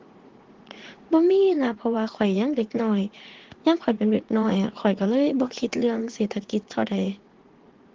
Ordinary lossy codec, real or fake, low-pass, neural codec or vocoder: Opus, 16 kbps; fake; 7.2 kHz; vocoder, 44.1 kHz, 80 mel bands, Vocos